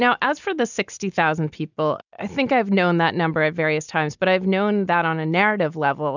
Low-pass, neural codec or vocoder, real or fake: 7.2 kHz; none; real